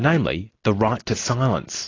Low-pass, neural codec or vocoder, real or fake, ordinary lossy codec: 7.2 kHz; none; real; AAC, 32 kbps